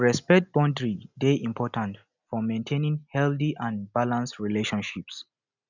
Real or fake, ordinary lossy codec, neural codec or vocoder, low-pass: real; none; none; 7.2 kHz